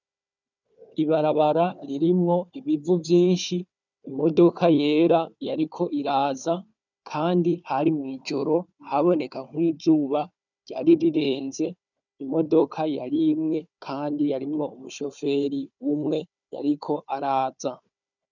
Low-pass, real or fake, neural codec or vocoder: 7.2 kHz; fake; codec, 16 kHz, 4 kbps, FunCodec, trained on Chinese and English, 50 frames a second